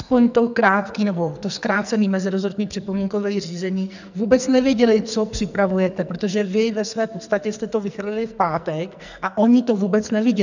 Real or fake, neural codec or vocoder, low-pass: fake; codec, 44.1 kHz, 2.6 kbps, SNAC; 7.2 kHz